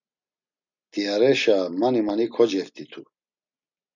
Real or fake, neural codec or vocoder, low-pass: real; none; 7.2 kHz